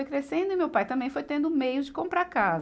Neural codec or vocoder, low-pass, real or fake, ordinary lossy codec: none; none; real; none